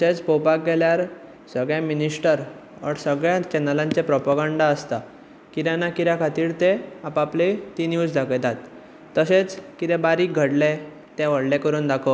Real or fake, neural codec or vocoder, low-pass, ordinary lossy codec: real; none; none; none